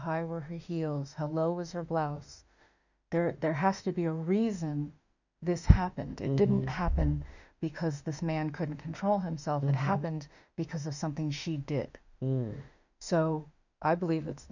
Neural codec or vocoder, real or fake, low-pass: autoencoder, 48 kHz, 32 numbers a frame, DAC-VAE, trained on Japanese speech; fake; 7.2 kHz